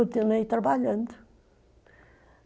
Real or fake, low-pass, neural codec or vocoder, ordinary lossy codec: real; none; none; none